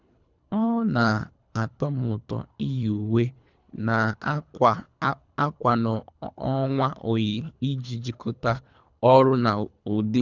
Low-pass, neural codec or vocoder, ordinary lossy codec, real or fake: 7.2 kHz; codec, 24 kHz, 3 kbps, HILCodec; none; fake